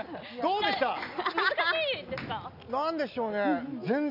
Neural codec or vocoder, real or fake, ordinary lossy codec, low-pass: none; real; none; 5.4 kHz